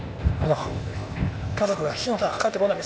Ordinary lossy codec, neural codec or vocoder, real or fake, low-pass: none; codec, 16 kHz, 0.8 kbps, ZipCodec; fake; none